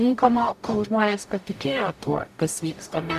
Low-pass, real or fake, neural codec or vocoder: 14.4 kHz; fake; codec, 44.1 kHz, 0.9 kbps, DAC